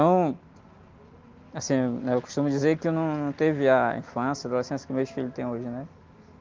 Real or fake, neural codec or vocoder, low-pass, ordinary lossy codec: real; none; 7.2 kHz; Opus, 24 kbps